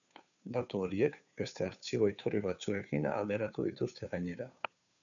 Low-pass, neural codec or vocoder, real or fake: 7.2 kHz; codec, 16 kHz, 2 kbps, FunCodec, trained on Chinese and English, 25 frames a second; fake